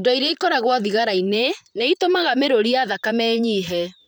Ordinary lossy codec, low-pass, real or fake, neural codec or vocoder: none; none; fake; vocoder, 44.1 kHz, 128 mel bands, Pupu-Vocoder